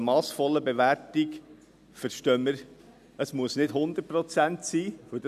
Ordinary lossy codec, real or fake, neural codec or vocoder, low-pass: none; real; none; 14.4 kHz